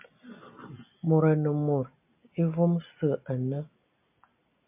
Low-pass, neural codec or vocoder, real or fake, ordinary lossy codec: 3.6 kHz; none; real; MP3, 32 kbps